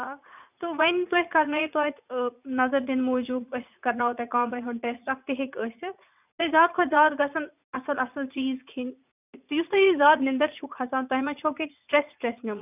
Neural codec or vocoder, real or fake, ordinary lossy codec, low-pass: vocoder, 44.1 kHz, 80 mel bands, Vocos; fake; none; 3.6 kHz